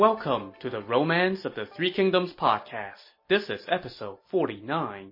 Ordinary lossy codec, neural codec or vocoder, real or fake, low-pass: MP3, 24 kbps; none; real; 5.4 kHz